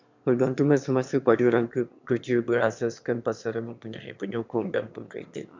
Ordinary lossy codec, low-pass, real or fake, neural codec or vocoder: MP3, 64 kbps; 7.2 kHz; fake; autoencoder, 22.05 kHz, a latent of 192 numbers a frame, VITS, trained on one speaker